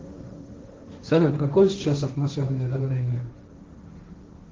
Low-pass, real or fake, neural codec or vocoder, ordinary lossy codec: 7.2 kHz; fake; codec, 16 kHz, 1.1 kbps, Voila-Tokenizer; Opus, 16 kbps